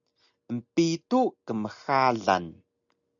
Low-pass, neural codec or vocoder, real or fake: 7.2 kHz; none; real